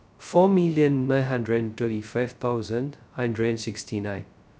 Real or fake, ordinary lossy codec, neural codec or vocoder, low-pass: fake; none; codec, 16 kHz, 0.2 kbps, FocalCodec; none